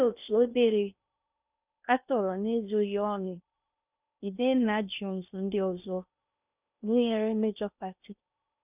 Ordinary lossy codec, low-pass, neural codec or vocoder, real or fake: none; 3.6 kHz; codec, 16 kHz in and 24 kHz out, 0.6 kbps, FocalCodec, streaming, 4096 codes; fake